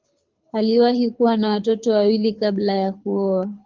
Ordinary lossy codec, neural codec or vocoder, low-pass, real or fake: Opus, 24 kbps; codec, 24 kHz, 6 kbps, HILCodec; 7.2 kHz; fake